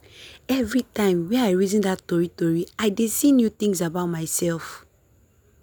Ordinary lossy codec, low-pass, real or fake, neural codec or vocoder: none; none; real; none